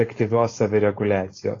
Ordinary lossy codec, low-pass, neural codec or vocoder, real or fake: AAC, 32 kbps; 7.2 kHz; codec, 16 kHz, 4.8 kbps, FACodec; fake